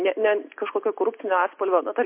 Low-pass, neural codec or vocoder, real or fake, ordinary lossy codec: 3.6 kHz; none; real; MP3, 24 kbps